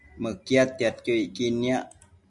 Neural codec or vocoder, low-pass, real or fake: none; 10.8 kHz; real